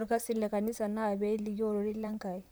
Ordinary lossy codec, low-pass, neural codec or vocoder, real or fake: none; none; vocoder, 44.1 kHz, 128 mel bands, Pupu-Vocoder; fake